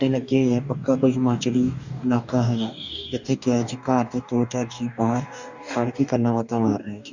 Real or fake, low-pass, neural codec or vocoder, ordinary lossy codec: fake; 7.2 kHz; codec, 44.1 kHz, 2.6 kbps, DAC; Opus, 64 kbps